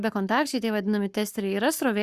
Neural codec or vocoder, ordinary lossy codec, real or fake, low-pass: codec, 44.1 kHz, 7.8 kbps, Pupu-Codec; Opus, 64 kbps; fake; 14.4 kHz